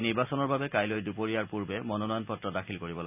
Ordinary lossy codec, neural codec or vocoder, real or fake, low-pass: none; none; real; 3.6 kHz